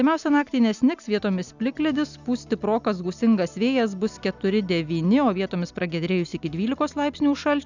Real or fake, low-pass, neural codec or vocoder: real; 7.2 kHz; none